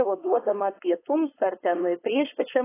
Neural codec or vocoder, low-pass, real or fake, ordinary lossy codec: codec, 16 kHz, 4.8 kbps, FACodec; 3.6 kHz; fake; AAC, 16 kbps